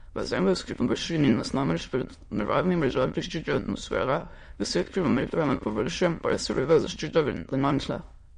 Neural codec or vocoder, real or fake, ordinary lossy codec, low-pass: autoencoder, 22.05 kHz, a latent of 192 numbers a frame, VITS, trained on many speakers; fake; MP3, 48 kbps; 9.9 kHz